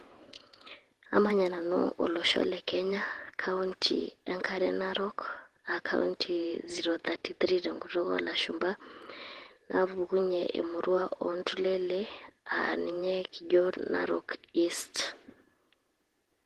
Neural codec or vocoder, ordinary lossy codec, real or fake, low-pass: vocoder, 48 kHz, 128 mel bands, Vocos; Opus, 16 kbps; fake; 14.4 kHz